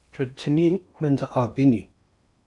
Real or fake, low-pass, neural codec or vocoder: fake; 10.8 kHz; codec, 16 kHz in and 24 kHz out, 0.8 kbps, FocalCodec, streaming, 65536 codes